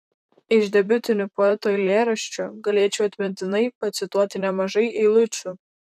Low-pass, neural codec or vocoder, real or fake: 14.4 kHz; vocoder, 44.1 kHz, 128 mel bands, Pupu-Vocoder; fake